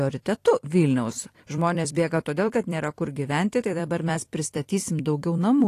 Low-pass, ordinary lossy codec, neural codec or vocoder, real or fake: 14.4 kHz; AAC, 48 kbps; vocoder, 44.1 kHz, 128 mel bands every 256 samples, BigVGAN v2; fake